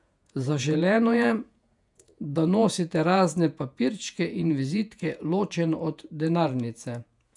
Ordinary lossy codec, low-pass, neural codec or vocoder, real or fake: none; 10.8 kHz; vocoder, 48 kHz, 128 mel bands, Vocos; fake